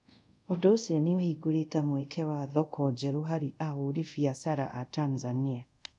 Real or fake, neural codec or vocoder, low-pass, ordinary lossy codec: fake; codec, 24 kHz, 0.5 kbps, DualCodec; 10.8 kHz; none